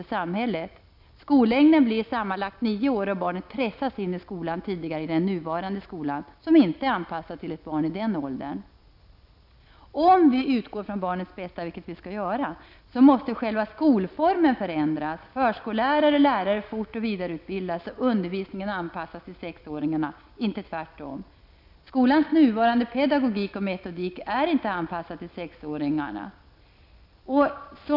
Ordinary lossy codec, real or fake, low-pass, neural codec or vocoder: none; real; 5.4 kHz; none